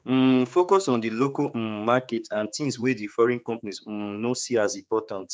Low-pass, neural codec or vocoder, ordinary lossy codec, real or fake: none; codec, 16 kHz, 4 kbps, X-Codec, HuBERT features, trained on general audio; none; fake